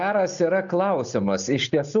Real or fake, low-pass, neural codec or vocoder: real; 7.2 kHz; none